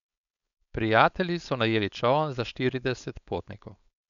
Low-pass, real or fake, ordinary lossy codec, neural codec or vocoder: 7.2 kHz; fake; none; codec, 16 kHz, 4.8 kbps, FACodec